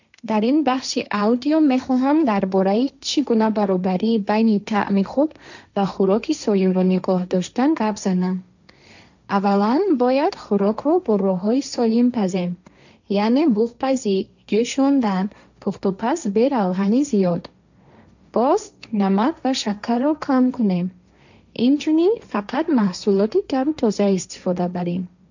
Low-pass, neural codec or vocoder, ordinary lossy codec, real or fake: 7.2 kHz; codec, 16 kHz, 1.1 kbps, Voila-Tokenizer; none; fake